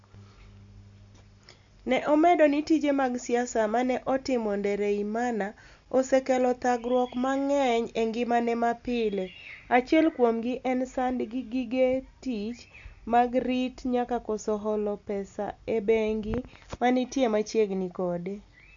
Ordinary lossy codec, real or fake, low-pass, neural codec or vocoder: MP3, 64 kbps; real; 7.2 kHz; none